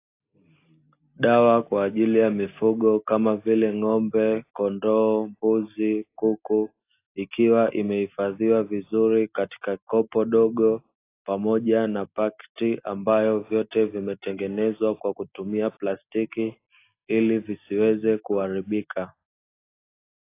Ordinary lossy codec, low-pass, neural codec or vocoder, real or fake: AAC, 24 kbps; 3.6 kHz; none; real